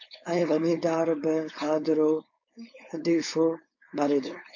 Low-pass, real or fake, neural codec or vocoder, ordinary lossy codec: 7.2 kHz; fake; codec, 16 kHz, 4.8 kbps, FACodec; AAC, 48 kbps